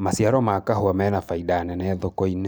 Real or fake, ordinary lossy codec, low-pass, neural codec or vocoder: fake; none; none; vocoder, 44.1 kHz, 128 mel bands every 256 samples, BigVGAN v2